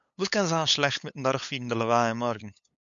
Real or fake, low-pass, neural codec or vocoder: fake; 7.2 kHz; codec, 16 kHz, 8 kbps, FunCodec, trained on LibriTTS, 25 frames a second